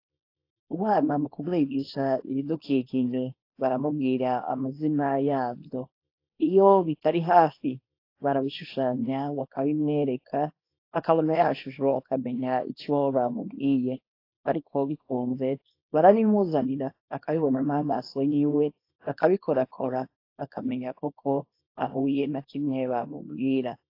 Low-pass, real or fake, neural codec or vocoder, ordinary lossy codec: 5.4 kHz; fake; codec, 24 kHz, 0.9 kbps, WavTokenizer, small release; AAC, 32 kbps